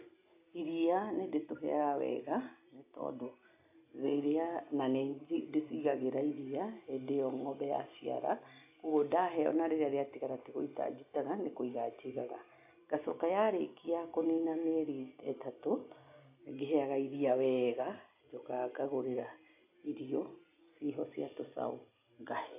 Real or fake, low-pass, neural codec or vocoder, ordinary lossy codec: real; 3.6 kHz; none; AAC, 32 kbps